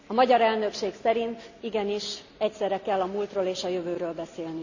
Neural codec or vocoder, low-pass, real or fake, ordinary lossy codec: none; 7.2 kHz; real; none